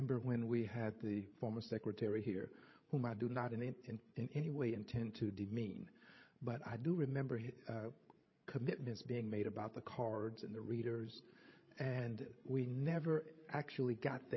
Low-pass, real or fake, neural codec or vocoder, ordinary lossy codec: 7.2 kHz; fake; codec, 16 kHz, 16 kbps, FreqCodec, larger model; MP3, 24 kbps